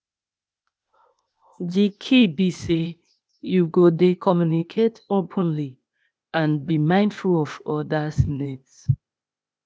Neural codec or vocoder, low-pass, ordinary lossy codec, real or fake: codec, 16 kHz, 0.8 kbps, ZipCodec; none; none; fake